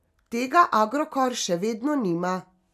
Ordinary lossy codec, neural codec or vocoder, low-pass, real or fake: none; none; 14.4 kHz; real